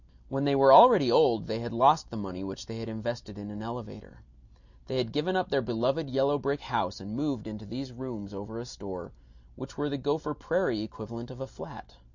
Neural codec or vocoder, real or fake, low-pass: none; real; 7.2 kHz